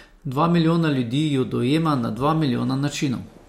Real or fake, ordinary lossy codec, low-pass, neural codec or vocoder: real; MP3, 64 kbps; 19.8 kHz; none